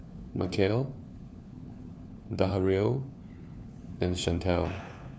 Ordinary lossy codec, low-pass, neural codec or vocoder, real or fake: none; none; codec, 16 kHz, 4 kbps, FunCodec, trained on LibriTTS, 50 frames a second; fake